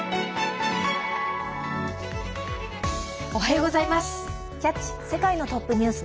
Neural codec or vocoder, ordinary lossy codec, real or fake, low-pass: none; none; real; none